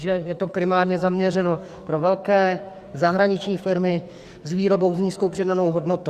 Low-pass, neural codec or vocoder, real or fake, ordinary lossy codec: 14.4 kHz; codec, 44.1 kHz, 2.6 kbps, SNAC; fake; Opus, 64 kbps